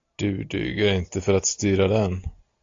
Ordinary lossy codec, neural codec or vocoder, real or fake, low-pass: AAC, 48 kbps; none; real; 7.2 kHz